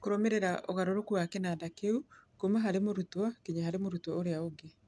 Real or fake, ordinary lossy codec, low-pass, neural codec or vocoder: real; none; none; none